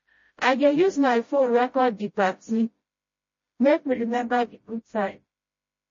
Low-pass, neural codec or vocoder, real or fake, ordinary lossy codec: 7.2 kHz; codec, 16 kHz, 0.5 kbps, FreqCodec, smaller model; fake; MP3, 32 kbps